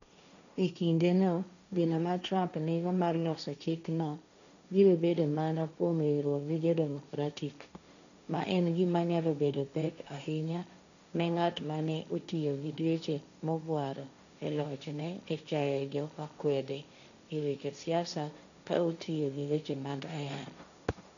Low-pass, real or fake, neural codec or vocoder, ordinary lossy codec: 7.2 kHz; fake; codec, 16 kHz, 1.1 kbps, Voila-Tokenizer; none